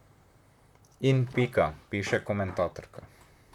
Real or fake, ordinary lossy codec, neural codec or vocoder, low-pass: fake; none; vocoder, 44.1 kHz, 128 mel bands, Pupu-Vocoder; 19.8 kHz